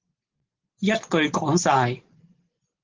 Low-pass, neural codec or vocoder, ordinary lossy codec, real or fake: 7.2 kHz; none; Opus, 16 kbps; real